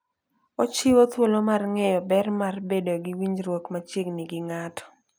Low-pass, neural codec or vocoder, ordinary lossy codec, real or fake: none; none; none; real